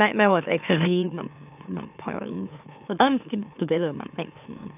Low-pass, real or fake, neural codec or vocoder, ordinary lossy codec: 3.6 kHz; fake; autoencoder, 44.1 kHz, a latent of 192 numbers a frame, MeloTTS; none